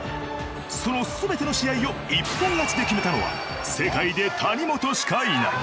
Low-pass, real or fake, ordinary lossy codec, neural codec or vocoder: none; real; none; none